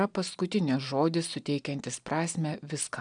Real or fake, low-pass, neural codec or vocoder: real; 9.9 kHz; none